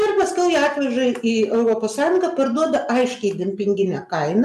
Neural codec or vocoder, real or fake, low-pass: none; real; 14.4 kHz